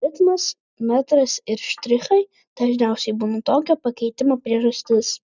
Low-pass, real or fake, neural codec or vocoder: 7.2 kHz; real; none